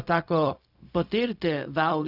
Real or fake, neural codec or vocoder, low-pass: fake; codec, 16 kHz, 0.4 kbps, LongCat-Audio-Codec; 5.4 kHz